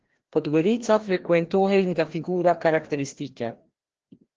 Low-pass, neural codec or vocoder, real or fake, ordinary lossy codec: 7.2 kHz; codec, 16 kHz, 1 kbps, FreqCodec, larger model; fake; Opus, 16 kbps